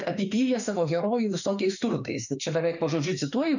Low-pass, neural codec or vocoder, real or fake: 7.2 kHz; autoencoder, 48 kHz, 32 numbers a frame, DAC-VAE, trained on Japanese speech; fake